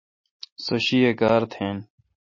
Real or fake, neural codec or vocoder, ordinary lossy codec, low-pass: real; none; MP3, 32 kbps; 7.2 kHz